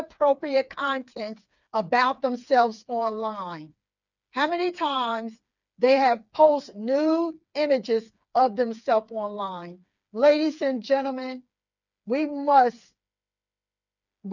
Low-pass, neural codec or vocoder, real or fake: 7.2 kHz; codec, 16 kHz, 4 kbps, FreqCodec, smaller model; fake